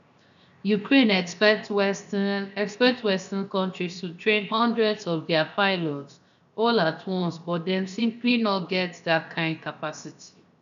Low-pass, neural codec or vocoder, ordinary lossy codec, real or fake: 7.2 kHz; codec, 16 kHz, 0.7 kbps, FocalCodec; none; fake